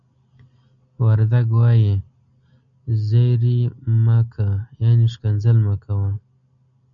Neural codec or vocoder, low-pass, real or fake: none; 7.2 kHz; real